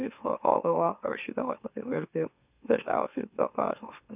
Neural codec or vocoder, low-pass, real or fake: autoencoder, 44.1 kHz, a latent of 192 numbers a frame, MeloTTS; 3.6 kHz; fake